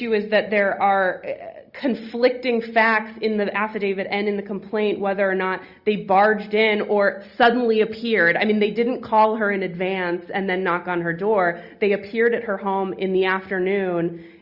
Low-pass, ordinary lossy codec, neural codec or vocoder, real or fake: 5.4 kHz; Opus, 64 kbps; none; real